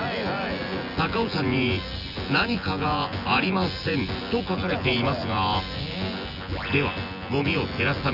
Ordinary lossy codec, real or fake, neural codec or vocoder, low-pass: none; fake; vocoder, 24 kHz, 100 mel bands, Vocos; 5.4 kHz